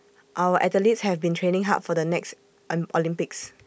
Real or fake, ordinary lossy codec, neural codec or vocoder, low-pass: real; none; none; none